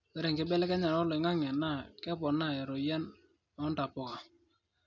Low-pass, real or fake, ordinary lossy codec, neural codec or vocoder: 7.2 kHz; real; none; none